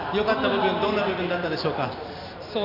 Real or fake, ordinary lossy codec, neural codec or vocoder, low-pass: real; none; none; 5.4 kHz